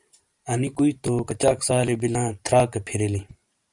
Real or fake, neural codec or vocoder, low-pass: fake; vocoder, 44.1 kHz, 128 mel bands every 512 samples, BigVGAN v2; 10.8 kHz